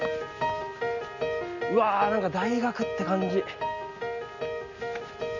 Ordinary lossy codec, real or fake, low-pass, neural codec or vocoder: none; real; 7.2 kHz; none